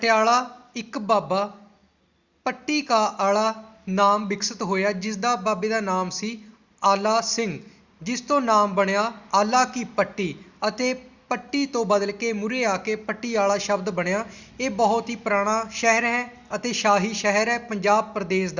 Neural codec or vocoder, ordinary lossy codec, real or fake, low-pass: none; Opus, 64 kbps; real; 7.2 kHz